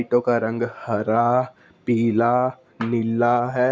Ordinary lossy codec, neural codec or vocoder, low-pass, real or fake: none; none; none; real